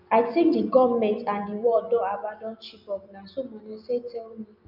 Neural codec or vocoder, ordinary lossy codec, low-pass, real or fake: none; none; 5.4 kHz; real